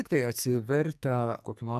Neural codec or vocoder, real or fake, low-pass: codec, 44.1 kHz, 2.6 kbps, SNAC; fake; 14.4 kHz